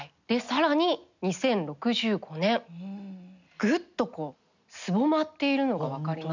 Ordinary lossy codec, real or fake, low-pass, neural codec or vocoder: none; real; 7.2 kHz; none